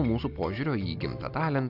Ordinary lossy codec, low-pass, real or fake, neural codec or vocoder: AAC, 48 kbps; 5.4 kHz; real; none